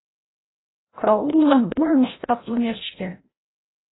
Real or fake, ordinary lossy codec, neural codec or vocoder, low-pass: fake; AAC, 16 kbps; codec, 16 kHz, 0.5 kbps, FreqCodec, larger model; 7.2 kHz